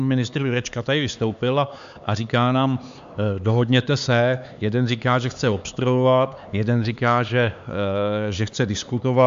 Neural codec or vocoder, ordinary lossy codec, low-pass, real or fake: codec, 16 kHz, 4 kbps, X-Codec, HuBERT features, trained on LibriSpeech; MP3, 64 kbps; 7.2 kHz; fake